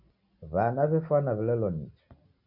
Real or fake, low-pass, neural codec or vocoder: real; 5.4 kHz; none